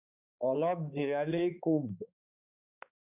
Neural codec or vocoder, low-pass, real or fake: codec, 16 kHz, 4 kbps, X-Codec, HuBERT features, trained on balanced general audio; 3.6 kHz; fake